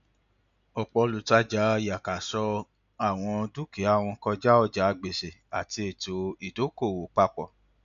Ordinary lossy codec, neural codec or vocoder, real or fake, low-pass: none; none; real; 7.2 kHz